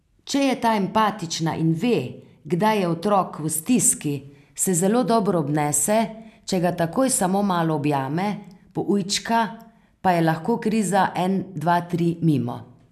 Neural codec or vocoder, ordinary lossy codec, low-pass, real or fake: none; none; 14.4 kHz; real